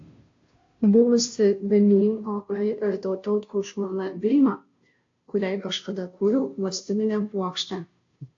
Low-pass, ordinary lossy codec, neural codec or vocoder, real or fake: 7.2 kHz; AAC, 48 kbps; codec, 16 kHz, 0.5 kbps, FunCodec, trained on Chinese and English, 25 frames a second; fake